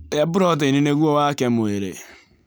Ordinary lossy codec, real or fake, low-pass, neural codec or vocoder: none; real; none; none